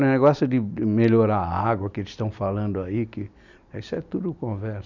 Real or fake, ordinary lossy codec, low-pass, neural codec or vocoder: real; none; 7.2 kHz; none